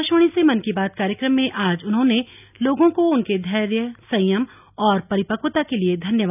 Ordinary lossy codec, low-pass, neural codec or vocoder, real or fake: none; 3.6 kHz; none; real